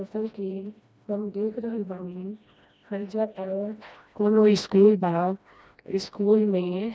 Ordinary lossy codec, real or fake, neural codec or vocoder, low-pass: none; fake; codec, 16 kHz, 1 kbps, FreqCodec, smaller model; none